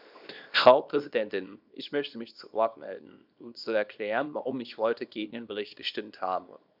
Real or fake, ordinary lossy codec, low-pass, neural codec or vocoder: fake; none; 5.4 kHz; codec, 24 kHz, 0.9 kbps, WavTokenizer, small release